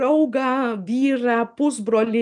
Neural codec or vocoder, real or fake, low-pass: none; real; 10.8 kHz